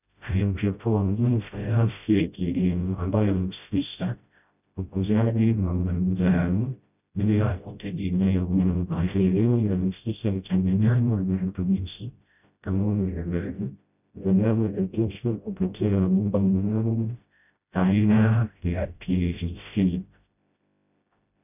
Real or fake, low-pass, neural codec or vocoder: fake; 3.6 kHz; codec, 16 kHz, 0.5 kbps, FreqCodec, smaller model